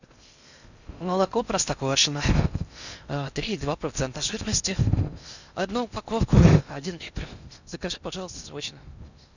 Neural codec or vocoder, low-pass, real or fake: codec, 16 kHz in and 24 kHz out, 0.6 kbps, FocalCodec, streaming, 4096 codes; 7.2 kHz; fake